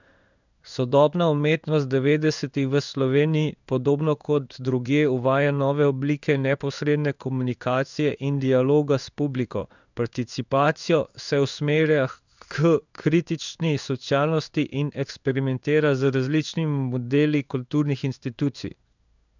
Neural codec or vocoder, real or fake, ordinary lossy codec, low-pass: codec, 16 kHz in and 24 kHz out, 1 kbps, XY-Tokenizer; fake; none; 7.2 kHz